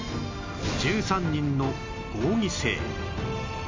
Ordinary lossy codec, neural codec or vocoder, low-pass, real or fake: MP3, 48 kbps; none; 7.2 kHz; real